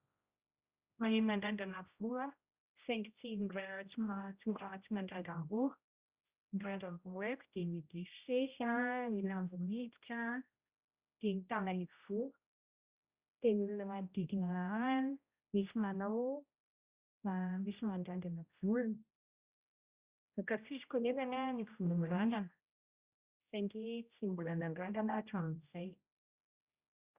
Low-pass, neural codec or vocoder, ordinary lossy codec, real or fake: 3.6 kHz; codec, 16 kHz, 0.5 kbps, X-Codec, HuBERT features, trained on general audio; Opus, 64 kbps; fake